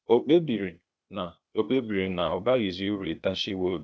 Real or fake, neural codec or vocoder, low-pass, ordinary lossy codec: fake; codec, 16 kHz, 0.8 kbps, ZipCodec; none; none